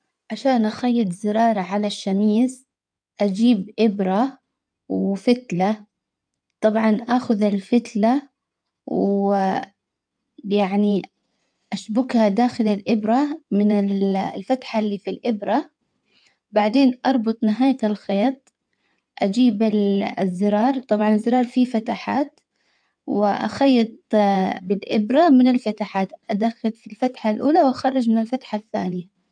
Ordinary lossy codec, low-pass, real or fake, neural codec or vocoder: none; 9.9 kHz; fake; codec, 16 kHz in and 24 kHz out, 2.2 kbps, FireRedTTS-2 codec